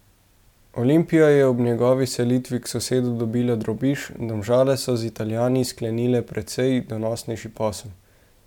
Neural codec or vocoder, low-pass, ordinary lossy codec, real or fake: none; 19.8 kHz; none; real